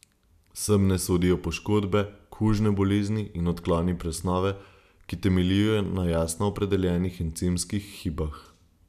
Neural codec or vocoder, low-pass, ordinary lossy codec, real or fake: none; 14.4 kHz; none; real